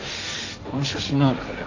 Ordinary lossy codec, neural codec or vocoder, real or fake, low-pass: AAC, 48 kbps; codec, 16 kHz, 1.1 kbps, Voila-Tokenizer; fake; 7.2 kHz